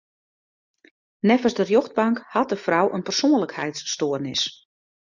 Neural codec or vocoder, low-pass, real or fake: none; 7.2 kHz; real